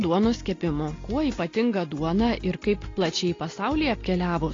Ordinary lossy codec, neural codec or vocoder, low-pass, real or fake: AAC, 32 kbps; none; 7.2 kHz; real